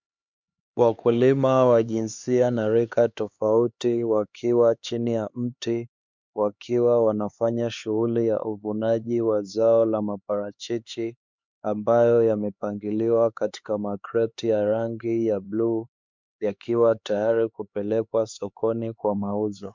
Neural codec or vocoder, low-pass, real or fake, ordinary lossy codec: codec, 16 kHz, 4 kbps, X-Codec, HuBERT features, trained on LibriSpeech; 7.2 kHz; fake; MP3, 64 kbps